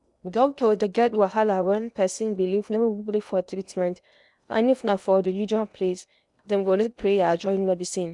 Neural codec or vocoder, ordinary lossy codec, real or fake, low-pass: codec, 16 kHz in and 24 kHz out, 0.8 kbps, FocalCodec, streaming, 65536 codes; none; fake; 10.8 kHz